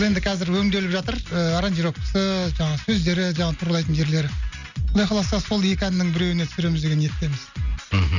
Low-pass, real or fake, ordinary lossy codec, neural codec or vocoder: 7.2 kHz; real; none; none